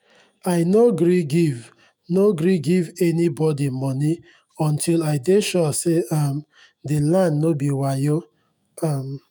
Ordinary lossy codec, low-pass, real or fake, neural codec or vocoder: none; none; fake; autoencoder, 48 kHz, 128 numbers a frame, DAC-VAE, trained on Japanese speech